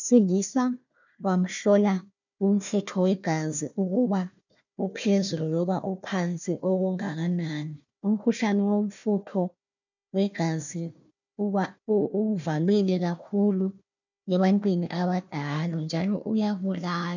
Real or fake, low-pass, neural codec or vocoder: fake; 7.2 kHz; codec, 16 kHz, 1 kbps, FunCodec, trained on Chinese and English, 50 frames a second